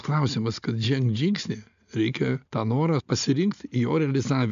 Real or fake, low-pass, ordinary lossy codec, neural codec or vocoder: real; 7.2 kHz; MP3, 96 kbps; none